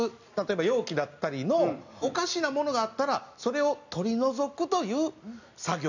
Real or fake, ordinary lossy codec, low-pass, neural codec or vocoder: real; none; 7.2 kHz; none